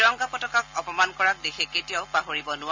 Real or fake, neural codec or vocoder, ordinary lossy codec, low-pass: real; none; MP3, 64 kbps; 7.2 kHz